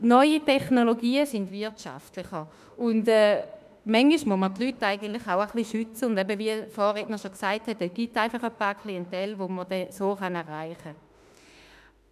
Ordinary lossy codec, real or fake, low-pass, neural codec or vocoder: none; fake; 14.4 kHz; autoencoder, 48 kHz, 32 numbers a frame, DAC-VAE, trained on Japanese speech